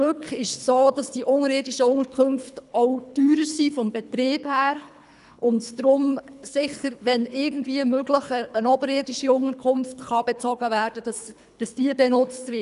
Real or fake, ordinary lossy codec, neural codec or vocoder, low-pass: fake; none; codec, 24 kHz, 3 kbps, HILCodec; 10.8 kHz